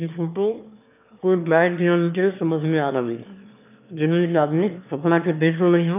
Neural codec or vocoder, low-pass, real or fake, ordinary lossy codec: autoencoder, 22.05 kHz, a latent of 192 numbers a frame, VITS, trained on one speaker; 3.6 kHz; fake; none